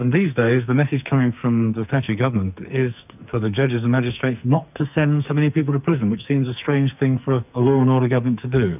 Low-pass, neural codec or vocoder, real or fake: 3.6 kHz; codec, 44.1 kHz, 2.6 kbps, SNAC; fake